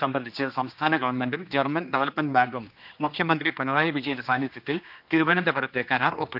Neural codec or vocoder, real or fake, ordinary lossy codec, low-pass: codec, 16 kHz, 2 kbps, X-Codec, HuBERT features, trained on general audio; fake; none; 5.4 kHz